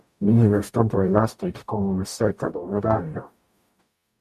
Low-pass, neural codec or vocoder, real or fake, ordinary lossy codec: 14.4 kHz; codec, 44.1 kHz, 0.9 kbps, DAC; fake; MP3, 96 kbps